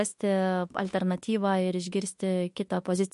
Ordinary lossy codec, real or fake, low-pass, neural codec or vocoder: MP3, 48 kbps; fake; 14.4 kHz; autoencoder, 48 kHz, 32 numbers a frame, DAC-VAE, trained on Japanese speech